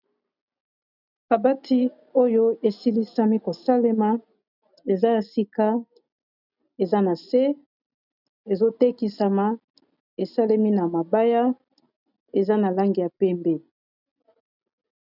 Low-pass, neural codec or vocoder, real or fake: 5.4 kHz; none; real